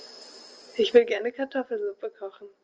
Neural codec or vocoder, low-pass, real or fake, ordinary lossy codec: none; 7.2 kHz; real; Opus, 24 kbps